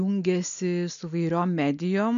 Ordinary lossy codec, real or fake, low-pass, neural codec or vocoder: AAC, 96 kbps; real; 7.2 kHz; none